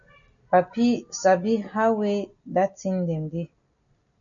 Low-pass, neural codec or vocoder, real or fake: 7.2 kHz; none; real